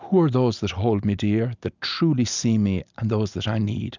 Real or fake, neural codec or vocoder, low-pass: real; none; 7.2 kHz